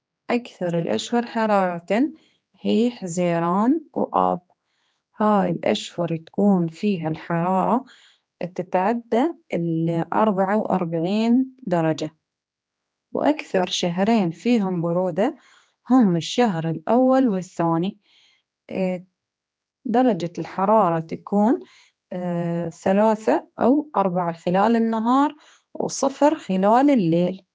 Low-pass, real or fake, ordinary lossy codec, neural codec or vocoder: none; fake; none; codec, 16 kHz, 2 kbps, X-Codec, HuBERT features, trained on general audio